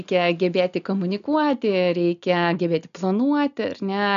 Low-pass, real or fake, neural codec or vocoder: 7.2 kHz; real; none